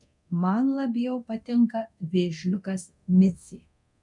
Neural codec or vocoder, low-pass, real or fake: codec, 24 kHz, 0.9 kbps, DualCodec; 10.8 kHz; fake